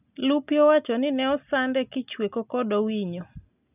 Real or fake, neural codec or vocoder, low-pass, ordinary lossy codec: real; none; 3.6 kHz; none